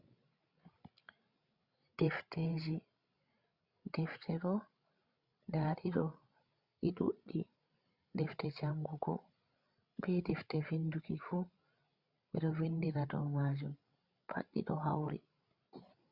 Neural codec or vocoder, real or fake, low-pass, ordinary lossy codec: codec, 16 kHz, 16 kbps, FreqCodec, larger model; fake; 5.4 kHz; Opus, 64 kbps